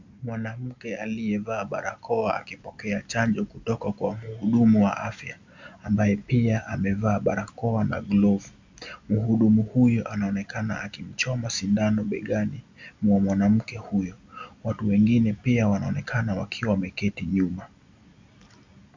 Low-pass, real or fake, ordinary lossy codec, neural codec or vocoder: 7.2 kHz; real; MP3, 64 kbps; none